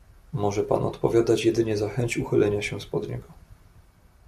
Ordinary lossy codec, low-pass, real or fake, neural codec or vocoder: MP3, 64 kbps; 14.4 kHz; real; none